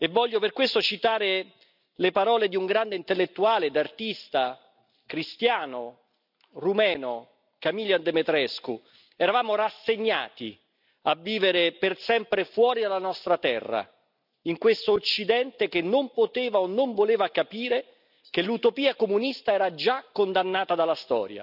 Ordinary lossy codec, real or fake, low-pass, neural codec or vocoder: none; real; 5.4 kHz; none